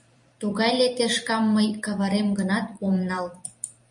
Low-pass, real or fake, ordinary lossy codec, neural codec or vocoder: 10.8 kHz; fake; MP3, 48 kbps; vocoder, 44.1 kHz, 128 mel bands every 256 samples, BigVGAN v2